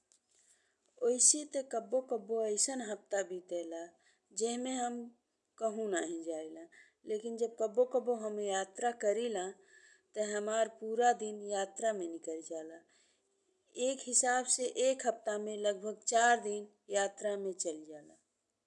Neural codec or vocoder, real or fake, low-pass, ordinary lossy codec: none; real; 10.8 kHz; none